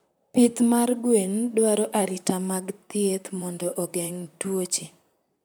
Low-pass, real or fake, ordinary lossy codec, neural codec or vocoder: none; fake; none; vocoder, 44.1 kHz, 128 mel bands, Pupu-Vocoder